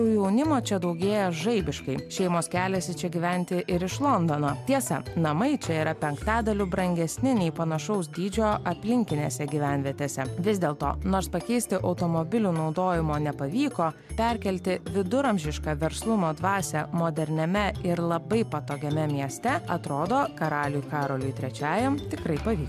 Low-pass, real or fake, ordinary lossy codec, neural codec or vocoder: 14.4 kHz; real; MP3, 96 kbps; none